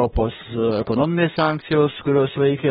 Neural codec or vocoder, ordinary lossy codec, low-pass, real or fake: codec, 32 kHz, 1.9 kbps, SNAC; AAC, 16 kbps; 14.4 kHz; fake